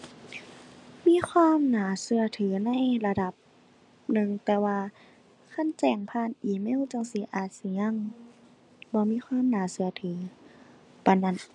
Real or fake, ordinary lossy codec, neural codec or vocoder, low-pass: real; none; none; 10.8 kHz